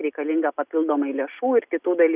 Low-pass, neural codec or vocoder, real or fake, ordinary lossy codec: 3.6 kHz; none; real; Opus, 64 kbps